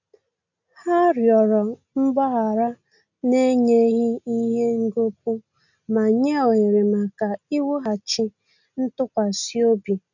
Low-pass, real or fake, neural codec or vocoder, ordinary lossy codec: 7.2 kHz; real; none; none